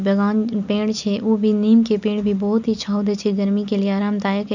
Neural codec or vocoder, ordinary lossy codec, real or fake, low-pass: none; none; real; 7.2 kHz